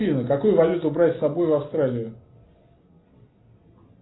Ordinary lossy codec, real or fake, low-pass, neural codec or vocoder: AAC, 16 kbps; real; 7.2 kHz; none